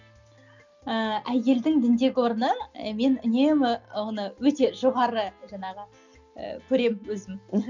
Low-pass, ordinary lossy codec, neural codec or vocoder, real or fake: 7.2 kHz; none; none; real